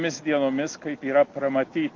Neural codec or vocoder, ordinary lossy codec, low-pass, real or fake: none; Opus, 32 kbps; 7.2 kHz; real